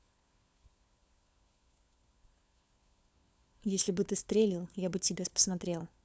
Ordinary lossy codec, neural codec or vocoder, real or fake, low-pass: none; codec, 16 kHz, 4 kbps, FunCodec, trained on LibriTTS, 50 frames a second; fake; none